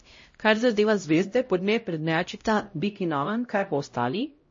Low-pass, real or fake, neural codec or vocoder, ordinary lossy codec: 7.2 kHz; fake; codec, 16 kHz, 0.5 kbps, X-Codec, HuBERT features, trained on LibriSpeech; MP3, 32 kbps